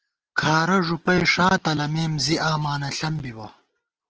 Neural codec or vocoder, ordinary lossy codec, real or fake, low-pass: none; Opus, 16 kbps; real; 7.2 kHz